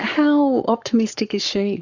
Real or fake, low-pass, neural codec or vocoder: fake; 7.2 kHz; codec, 44.1 kHz, 7.8 kbps, Pupu-Codec